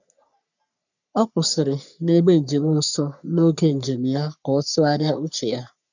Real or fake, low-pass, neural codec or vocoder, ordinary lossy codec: fake; 7.2 kHz; codec, 44.1 kHz, 3.4 kbps, Pupu-Codec; none